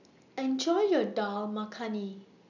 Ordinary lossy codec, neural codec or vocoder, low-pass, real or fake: none; none; 7.2 kHz; real